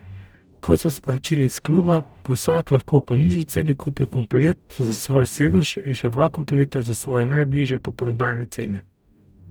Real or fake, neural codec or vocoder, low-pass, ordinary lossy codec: fake; codec, 44.1 kHz, 0.9 kbps, DAC; none; none